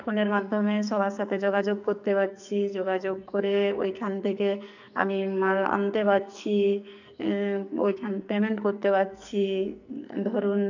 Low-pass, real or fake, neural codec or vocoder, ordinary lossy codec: 7.2 kHz; fake; codec, 44.1 kHz, 2.6 kbps, SNAC; none